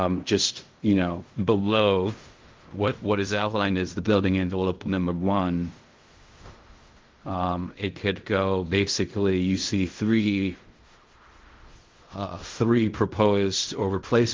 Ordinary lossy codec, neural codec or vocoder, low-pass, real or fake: Opus, 32 kbps; codec, 16 kHz in and 24 kHz out, 0.4 kbps, LongCat-Audio-Codec, fine tuned four codebook decoder; 7.2 kHz; fake